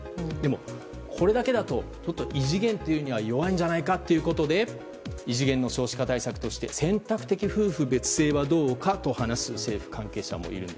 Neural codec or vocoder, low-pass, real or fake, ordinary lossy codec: none; none; real; none